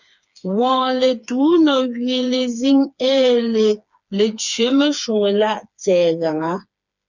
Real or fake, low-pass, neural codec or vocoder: fake; 7.2 kHz; codec, 16 kHz, 4 kbps, FreqCodec, smaller model